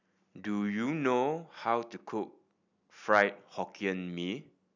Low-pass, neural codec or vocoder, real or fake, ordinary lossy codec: 7.2 kHz; none; real; none